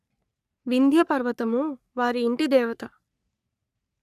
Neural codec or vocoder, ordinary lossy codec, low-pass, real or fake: codec, 44.1 kHz, 3.4 kbps, Pupu-Codec; none; 14.4 kHz; fake